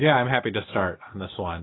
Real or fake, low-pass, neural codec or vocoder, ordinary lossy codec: real; 7.2 kHz; none; AAC, 16 kbps